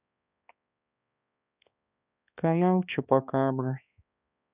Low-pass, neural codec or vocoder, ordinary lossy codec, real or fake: 3.6 kHz; codec, 16 kHz, 2 kbps, X-Codec, HuBERT features, trained on balanced general audio; none; fake